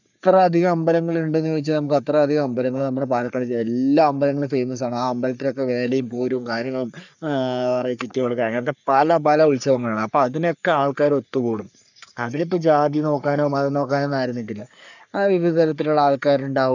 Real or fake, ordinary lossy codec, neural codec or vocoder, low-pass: fake; none; codec, 44.1 kHz, 3.4 kbps, Pupu-Codec; 7.2 kHz